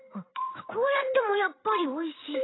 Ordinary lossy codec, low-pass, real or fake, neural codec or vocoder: AAC, 16 kbps; 7.2 kHz; fake; codec, 16 kHz, 8 kbps, FreqCodec, larger model